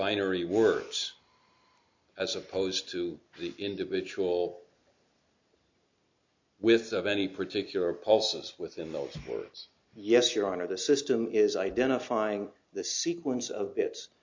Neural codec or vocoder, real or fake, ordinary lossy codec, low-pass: none; real; MP3, 48 kbps; 7.2 kHz